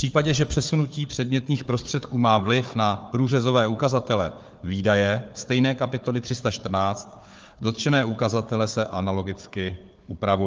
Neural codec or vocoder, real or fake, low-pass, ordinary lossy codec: codec, 16 kHz, 4 kbps, FunCodec, trained on Chinese and English, 50 frames a second; fake; 7.2 kHz; Opus, 24 kbps